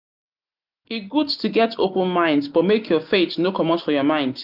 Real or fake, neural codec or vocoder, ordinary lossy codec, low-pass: real; none; none; 5.4 kHz